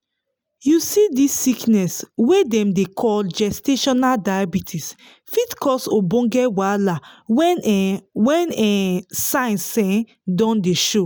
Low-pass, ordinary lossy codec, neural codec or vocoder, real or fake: none; none; none; real